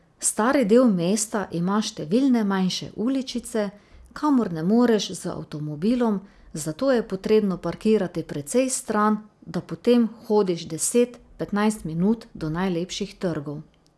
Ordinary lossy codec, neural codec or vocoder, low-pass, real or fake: none; none; none; real